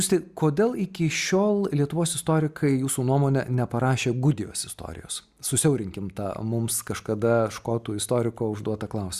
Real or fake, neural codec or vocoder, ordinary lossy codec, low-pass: real; none; Opus, 64 kbps; 14.4 kHz